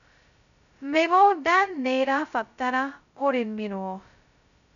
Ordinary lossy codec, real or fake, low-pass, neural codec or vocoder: none; fake; 7.2 kHz; codec, 16 kHz, 0.2 kbps, FocalCodec